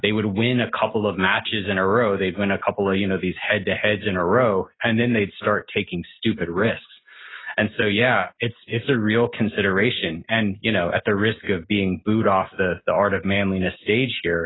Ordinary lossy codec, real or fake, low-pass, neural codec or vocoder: AAC, 16 kbps; real; 7.2 kHz; none